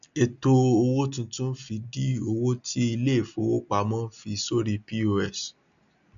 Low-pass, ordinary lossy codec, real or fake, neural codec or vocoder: 7.2 kHz; none; real; none